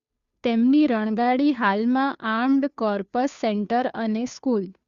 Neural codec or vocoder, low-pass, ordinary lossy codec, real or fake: codec, 16 kHz, 2 kbps, FunCodec, trained on Chinese and English, 25 frames a second; 7.2 kHz; Opus, 64 kbps; fake